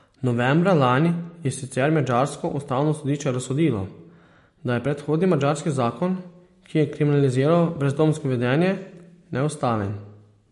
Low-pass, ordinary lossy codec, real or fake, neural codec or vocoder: 14.4 kHz; MP3, 48 kbps; real; none